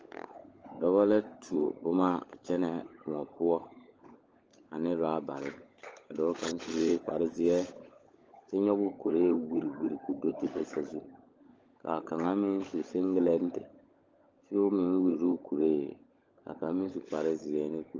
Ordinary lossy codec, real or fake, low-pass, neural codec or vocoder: Opus, 24 kbps; fake; 7.2 kHz; codec, 16 kHz, 16 kbps, FunCodec, trained on LibriTTS, 50 frames a second